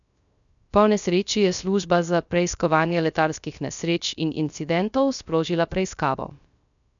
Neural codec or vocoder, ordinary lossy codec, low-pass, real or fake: codec, 16 kHz, 0.3 kbps, FocalCodec; none; 7.2 kHz; fake